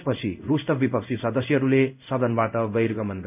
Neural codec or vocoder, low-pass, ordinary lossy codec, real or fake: codec, 16 kHz in and 24 kHz out, 1 kbps, XY-Tokenizer; 3.6 kHz; none; fake